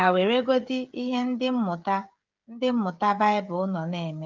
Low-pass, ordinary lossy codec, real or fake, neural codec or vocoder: 7.2 kHz; Opus, 24 kbps; fake; codec, 16 kHz, 16 kbps, FreqCodec, smaller model